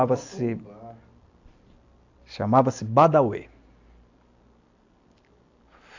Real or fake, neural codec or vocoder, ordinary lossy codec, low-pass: real; none; AAC, 48 kbps; 7.2 kHz